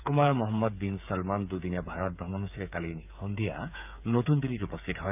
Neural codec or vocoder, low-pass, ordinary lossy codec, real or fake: codec, 16 kHz, 8 kbps, FreqCodec, smaller model; 3.6 kHz; none; fake